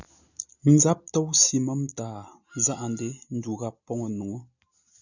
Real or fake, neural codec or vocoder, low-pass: real; none; 7.2 kHz